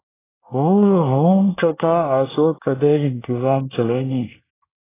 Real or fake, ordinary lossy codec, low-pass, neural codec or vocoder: fake; AAC, 16 kbps; 3.6 kHz; codec, 24 kHz, 1 kbps, SNAC